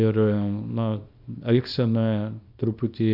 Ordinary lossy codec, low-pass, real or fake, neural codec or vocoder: Opus, 64 kbps; 5.4 kHz; fake; codec, 24 kHz, 0.9 kbps, WavTokenizer, small release